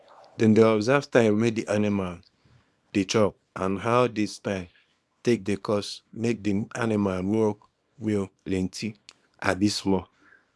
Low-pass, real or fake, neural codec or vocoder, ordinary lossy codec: none; fake; codec, 24 kHz, 0.9 kbps, WavTokenizer, small release; none